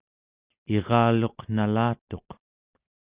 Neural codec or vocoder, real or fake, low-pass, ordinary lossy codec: none; real; 3.6 kHz; Opus, 64 kbps